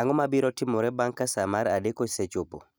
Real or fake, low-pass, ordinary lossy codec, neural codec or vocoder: real; none; none; none